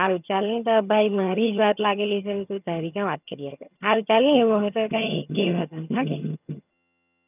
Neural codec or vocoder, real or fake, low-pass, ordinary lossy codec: vocoder, 22.05 kHz, 80 mel bands, HiFi-GAN; fake; 3.6 kHz; none